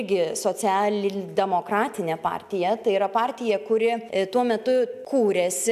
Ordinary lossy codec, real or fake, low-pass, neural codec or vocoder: AAC, 96 kbps; real; 14.4 kHz; none